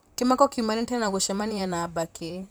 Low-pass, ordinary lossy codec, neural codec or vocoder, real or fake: none; none; vocoder, 44.1 kHz, 128 mel bands, Pupu-Vocoder; fake